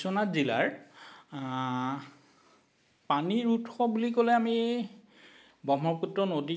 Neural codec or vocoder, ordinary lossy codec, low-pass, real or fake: none; none; none; real